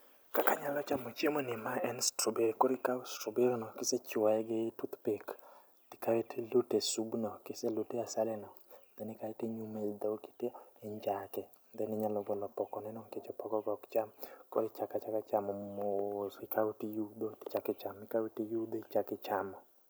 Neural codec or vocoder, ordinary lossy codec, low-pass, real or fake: none; none; none; real